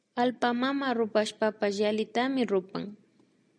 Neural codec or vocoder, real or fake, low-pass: none; real; 9.9 kHz